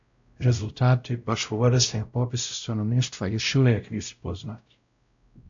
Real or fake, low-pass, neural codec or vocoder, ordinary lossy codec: fake; 7.2 kHz; codec, 16 kHz, 0.5 kbps, X-Codec, WavLM features, trained on Multilingual LibriSpeech; AAC, 64 kbps